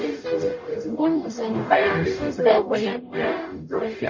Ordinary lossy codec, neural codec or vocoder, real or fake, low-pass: MP3, 32 kbps; codec, 44.1 kHz, 0.9 kbps, DAC; fake; 7.2 kHz